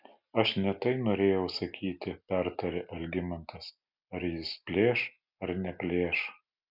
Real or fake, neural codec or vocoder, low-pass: real; none; 5.4 kHz